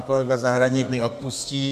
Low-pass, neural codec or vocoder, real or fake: 14.4 kHz; codec, 32 kHz, 1.9 kbps, SNAC; fake